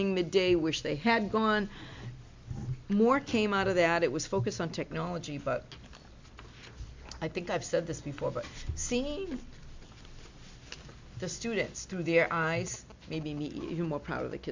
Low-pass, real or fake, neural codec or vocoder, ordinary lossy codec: 7.2 kHz; real; none; MP3, 64 kbps